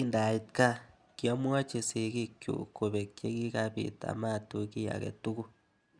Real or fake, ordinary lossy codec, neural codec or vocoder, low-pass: real; Opus, 64 kbps; none; 9.9 kHz